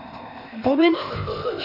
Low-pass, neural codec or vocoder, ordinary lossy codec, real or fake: 5.4 kHz; codec, 16 kHz, 0.8 kbps, ZipCodec; none; fake